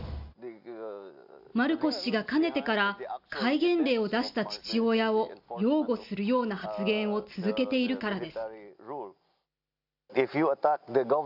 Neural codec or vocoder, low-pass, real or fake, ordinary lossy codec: none; 5.4 kHz; real; AAC, 48 kbps